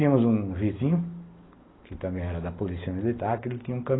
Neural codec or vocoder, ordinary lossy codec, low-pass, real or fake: none; AAC, 16 kbps; 7.2 kHz; real